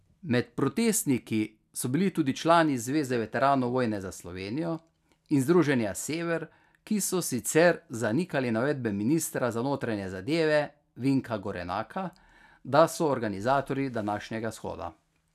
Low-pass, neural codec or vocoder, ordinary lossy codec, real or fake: 14.4 kHz; none; none; real